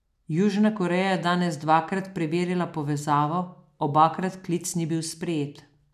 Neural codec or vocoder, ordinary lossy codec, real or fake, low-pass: none; none; real; 14.4 kHz